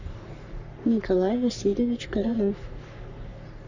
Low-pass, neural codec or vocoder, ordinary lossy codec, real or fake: 7.2 kHz; codec, 44.1 kHz, 3.4 kbps, Pupu-Codec; Opus, 64 kbps; fake